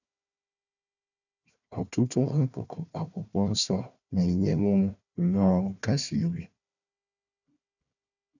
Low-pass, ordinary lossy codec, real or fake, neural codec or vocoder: 7.2 kHz; none; fake; codec, 16 kHz, 1 kbps, FunCodec, trained on Chinese and English, 50 frames a second